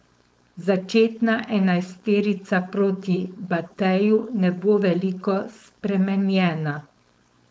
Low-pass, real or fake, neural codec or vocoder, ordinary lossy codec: none; fake; codec, 16 kHz, 4.8 kbps, FACodec; none